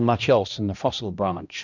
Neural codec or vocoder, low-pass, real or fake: codec, 16 kHz, 1 kbps, X-Codec, HuBERT features, trained on balanced general audio; 7.2 kHz; fake